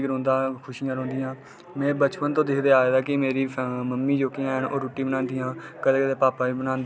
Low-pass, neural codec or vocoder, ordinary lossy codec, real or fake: none; none; none; real